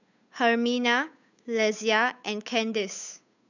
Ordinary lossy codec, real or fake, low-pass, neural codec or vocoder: none; fake; 7.2 kHz; codec, 16 kHz, 8 kbps, FunCodec, trained on Chinese and English, 25 frames a second